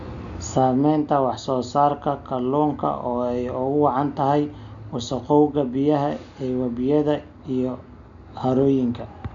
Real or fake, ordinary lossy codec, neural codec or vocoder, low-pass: real; none; none; 7.2 kHz